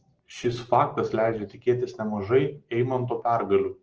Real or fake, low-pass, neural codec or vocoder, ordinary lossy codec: real; 7.2 kHz; none; Opus, 16 kbps